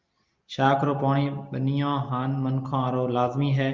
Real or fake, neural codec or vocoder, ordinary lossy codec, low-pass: real; none; Opus, 32 kbps; 7.2 kHz